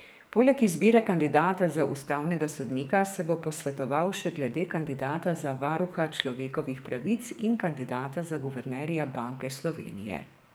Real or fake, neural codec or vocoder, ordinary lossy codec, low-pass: fake; codec, 44.1 kHz, 2.6 kbps, SNAC; none; none